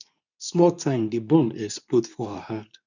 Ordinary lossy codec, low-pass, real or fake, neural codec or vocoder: none; 7.2 kHz; fake; codec, 24 kHz, 0.9 kbps, WavTokenizer, medium speech release version 2